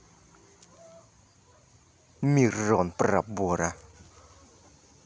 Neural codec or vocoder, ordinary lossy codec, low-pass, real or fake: none; none; none; real